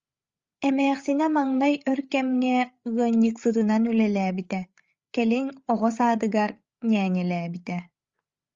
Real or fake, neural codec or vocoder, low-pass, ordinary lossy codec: fake; codec, 16 kHz, 8 kbps, FreqCodec, larger model; 7.2 kHz; Opus, 32 kbps